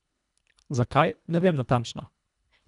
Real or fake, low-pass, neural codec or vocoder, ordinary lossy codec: fake; 10.8 kHz; codec, 24 kHz, 1.5 kbps, HILCodec; none